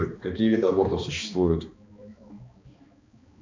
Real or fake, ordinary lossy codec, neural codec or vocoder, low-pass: fake; MP3, 64 kbps; codec, 16 kHz, 2 kbps, X-Codec, HuBERT features, trained on balanced general audio; 7.2 kHz